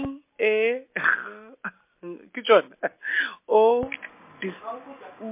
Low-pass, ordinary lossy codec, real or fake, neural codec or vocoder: 3.6 kHz; MP3, 24 kbps; real; none